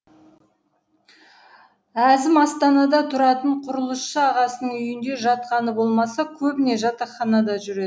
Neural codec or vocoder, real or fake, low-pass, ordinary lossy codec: none; real; none; none